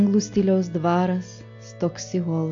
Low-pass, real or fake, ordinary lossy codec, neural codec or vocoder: 7.2 kHz; real; AAC, 48 kbps; none